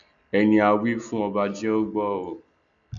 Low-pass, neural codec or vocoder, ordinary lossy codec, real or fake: 7.2 kHz; none; none; real